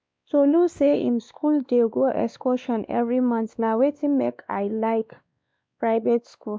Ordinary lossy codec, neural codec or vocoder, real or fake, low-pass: none; codec, 16 kHz, 2 kbps, X-Codec, WavLM features, trained on Multilingual LibriSpeech; fake; none